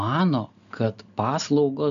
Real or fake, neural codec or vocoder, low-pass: real; none; 7.2 kHz